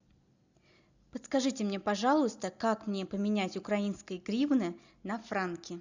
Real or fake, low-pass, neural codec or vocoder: real; 7.2 kHz; none